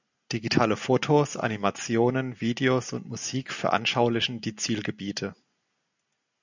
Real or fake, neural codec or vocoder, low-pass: real; none; 7.2 kHz